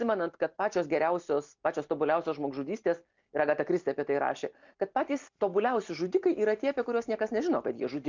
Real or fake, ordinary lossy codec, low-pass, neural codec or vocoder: real; MP3, 64 kbps; 7.2 kHz; none